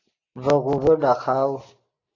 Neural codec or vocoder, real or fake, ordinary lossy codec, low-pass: none; real; AAC, 32 kbps; 7.2 kHz